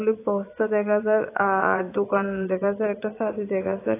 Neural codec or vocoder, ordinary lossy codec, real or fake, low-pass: vocoder, 22.05 kHz, 80 mel bands, Vocos; AAC, 24 kbps; fake; 3.6 kHz